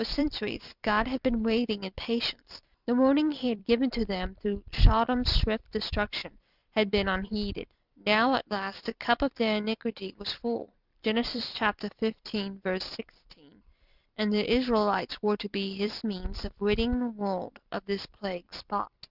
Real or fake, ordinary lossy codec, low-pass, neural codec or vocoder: fake; Opus, 64 kbps; 5.4 kHz; vocoder, 44.1 kHz, 128 mel bands every 256 samples, BigVGAN v2